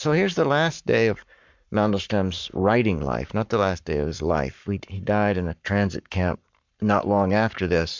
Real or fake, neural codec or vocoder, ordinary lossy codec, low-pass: fake; codec, 44.1 kHz, 7.8 kbps, Pupu-Codec; MP3, 64 kbps; 7.2 kHz